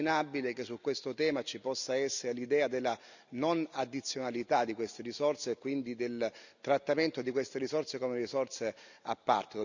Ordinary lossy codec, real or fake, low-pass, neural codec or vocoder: none; real; 7.2 kHz; none